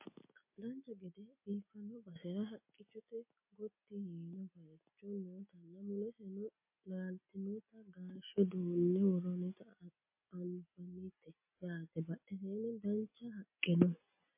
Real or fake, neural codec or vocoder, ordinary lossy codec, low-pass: real; none; MP3, 24 kbps; 3.6 kHz